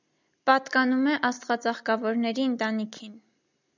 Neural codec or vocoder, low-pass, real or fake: none; 7.2 kHz; real